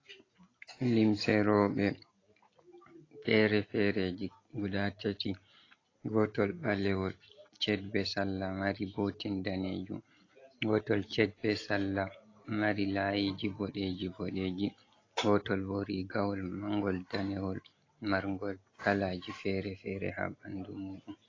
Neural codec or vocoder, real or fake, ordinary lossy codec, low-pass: none; real; AAC, 32 kbps; 7.2 kHz